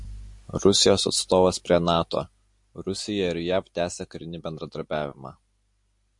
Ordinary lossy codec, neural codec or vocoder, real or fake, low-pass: MP3, 48 kbps; none; real; 10.8 kHz